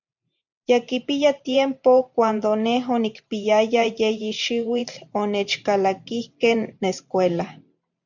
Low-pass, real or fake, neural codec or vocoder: 7.2 kHz; fake; vocoder, 44.1 kHz, 128 mel bands every 512 samples, BigVGAN v2